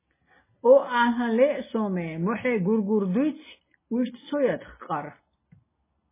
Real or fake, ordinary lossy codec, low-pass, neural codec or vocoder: real; MP3, 16 kbps; 3.6 kHz; none